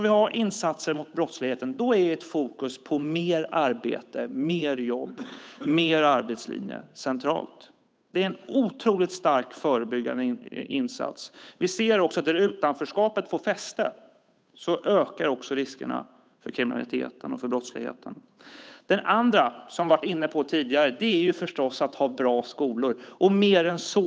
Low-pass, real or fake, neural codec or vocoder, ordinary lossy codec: none; fake; codec, 16 kHz, 8 kbps, FunCodec, trained on Chinese and English, 25 frames a second; none